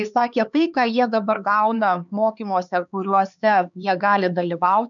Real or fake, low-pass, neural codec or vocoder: fake; 7.2 kHz; codec, 16 kHz, 4 kbps, X-Codec, HuBERT features, trained on LibriSpeech